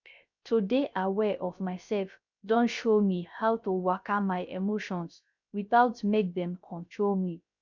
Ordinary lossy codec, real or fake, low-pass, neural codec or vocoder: none; fake; 7.2 kHz; codec, 16 kHz, 0.3 kbps, FocalCodec